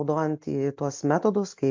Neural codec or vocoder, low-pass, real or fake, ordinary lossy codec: none; 7.2 kHz; real; MP3, 48 kbps